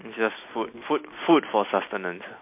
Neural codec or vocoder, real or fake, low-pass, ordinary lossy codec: none; real; 3.6 kHz; MP3, 24 kbps